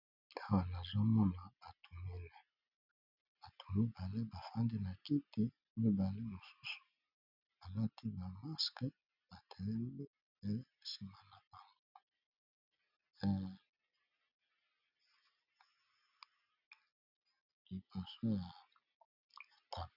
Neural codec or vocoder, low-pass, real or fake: none; 5.4 kHz; real